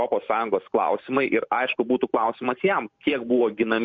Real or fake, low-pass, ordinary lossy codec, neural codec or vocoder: fake; 7.2 kHz; MP3, 64 kbps; vocoder, 44.1 kHz, 128 mel bands every 512 samples, BigVGAN v2